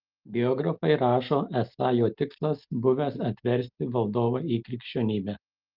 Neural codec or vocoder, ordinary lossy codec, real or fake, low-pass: none; Opus, 32 kbps; real; 5.4 kHz